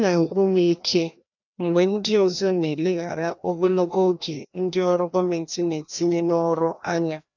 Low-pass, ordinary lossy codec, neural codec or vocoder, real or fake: 7.2 kHz; none; codec, 16 kHz, 1 kbps, FreqCodec, larger model; fake